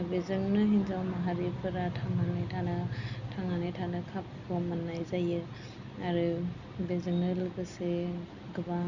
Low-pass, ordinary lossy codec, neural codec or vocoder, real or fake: 7.2 kHz; none; none; real